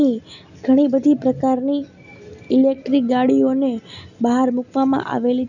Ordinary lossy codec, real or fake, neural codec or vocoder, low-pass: none; real; none; 7.2 kHz